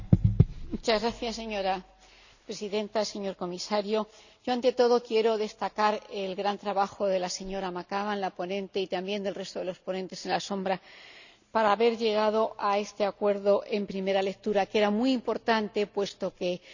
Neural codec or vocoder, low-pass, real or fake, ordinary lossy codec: none; 7.2 kHz; real; none